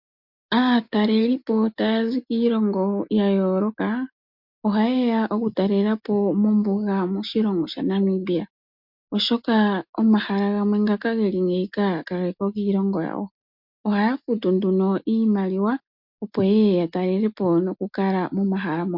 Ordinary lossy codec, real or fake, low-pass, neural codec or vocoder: MP3, 48 kbps; real; 5.4 kHz; none